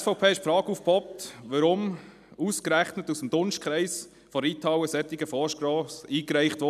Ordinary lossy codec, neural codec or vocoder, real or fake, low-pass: none; none; real; 14.4 kHz